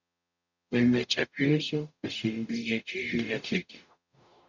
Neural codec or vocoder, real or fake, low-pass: codec, 44.1 kHz, 0.9 kbps, DAC; fake; 7.2 kHz